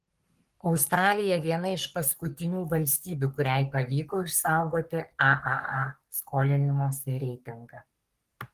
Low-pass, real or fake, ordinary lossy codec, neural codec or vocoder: 14.4 kHz; fake; Opus, 24 kbps; codec, 44.1 kHz, 3.4 kbps, Pupu-Codec